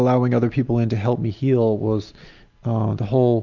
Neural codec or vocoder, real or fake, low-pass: none; real; 7.2 kHz